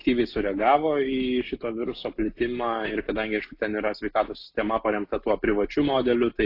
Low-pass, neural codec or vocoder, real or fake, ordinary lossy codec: 5.4 kHz; none; real; AAC, 32 kbps